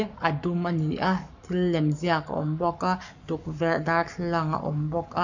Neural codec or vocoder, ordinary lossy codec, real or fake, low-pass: codec, 44.1 kHz, 7.8 kbps, Pupu-Codec; none; fake; 7.2 kHz